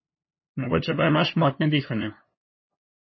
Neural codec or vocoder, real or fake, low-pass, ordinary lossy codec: codec, 16 kHz, 2 kbps, FunCodec, trained on LibriTTS, 25 frames a second; fake; 7.2 kHz; MP3, 24 kbps